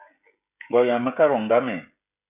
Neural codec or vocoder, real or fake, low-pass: codec, 16 kHz, 16 kbps, FreqCodec, smaller model; fake; 3.6 kHz